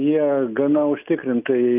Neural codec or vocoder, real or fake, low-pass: none; real; 3.6 kHz